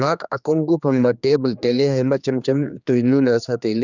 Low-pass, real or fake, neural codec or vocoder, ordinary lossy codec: 7.2 kHz; fake; codec, 16 kHz, 2 kbps, X-Codec, HuBERT features, trained on general audio; none